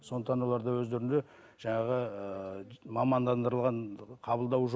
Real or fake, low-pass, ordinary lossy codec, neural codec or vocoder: real; none; none; none